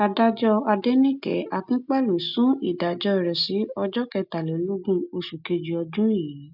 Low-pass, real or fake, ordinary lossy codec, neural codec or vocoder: 5.4 kHz; real; none; none